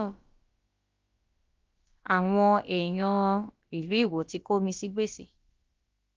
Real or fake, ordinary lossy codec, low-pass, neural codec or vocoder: fake; Opus, 24 kbps; 7.2 kHz; codec, 16 kHz, about 1 kbps, DyCAST, with the encoder's durations